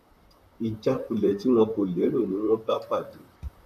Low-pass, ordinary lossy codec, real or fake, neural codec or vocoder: 14.4 kHz; none; fake; vocoder, 44.1 kHz, 128 mel bands, Pupu-Vocoder